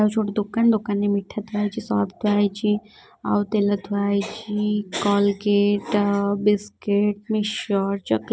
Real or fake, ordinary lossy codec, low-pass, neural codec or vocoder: real; none; none; none